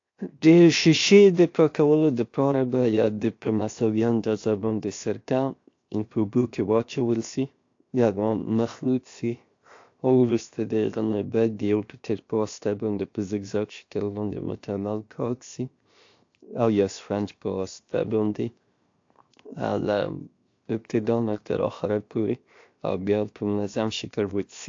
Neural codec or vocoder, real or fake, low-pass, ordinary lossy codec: codec, 16 kHz, 0.7 kbps, FocalCodec; fake; 7.2 kHz; AAC, 48 kbps